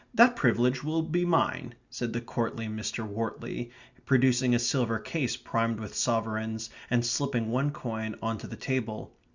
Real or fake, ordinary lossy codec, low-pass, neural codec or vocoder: real; Opus, 64 kbps; 7.2 kHz; none